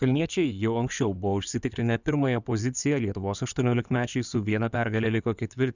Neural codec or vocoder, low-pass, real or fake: codec, 16 kHz in and 24 kHz out, 2.2 kbps, FireRedTTS-2 codec; 7.2 kHz; fake